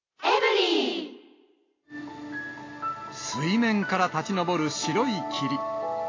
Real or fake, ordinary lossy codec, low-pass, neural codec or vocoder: real; AAC, 32 kbps; 7.2 kHz; none